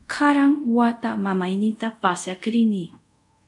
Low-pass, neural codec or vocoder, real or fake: 10.8 kHz; codec, 24 kHz, 0.5 kbps, DualCodec; fake